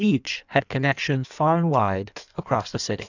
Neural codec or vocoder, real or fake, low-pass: codec, 16 kHz in and 24 kHz out, 1.1 kbps, FireRedTTS-2 codec; fake; 7.2 kHz